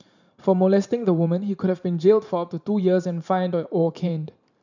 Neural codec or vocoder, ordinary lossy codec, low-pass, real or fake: vocoder, 44.1 kHz, 128 mel bands every 512 samples, BigVGAN v2; none; 7.2 kHz; fake